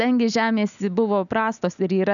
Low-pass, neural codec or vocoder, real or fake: 7.2 kHz; none; real